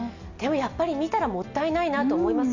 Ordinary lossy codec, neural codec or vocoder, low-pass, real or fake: none; none; 7.2 kHz; real